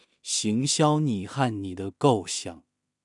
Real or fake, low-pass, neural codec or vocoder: fake; 10.8 kHz; codec, 16 kHz in and 24 kHz out, 0.4 kbps, LongCat-Audio-Codec, two codebook decoder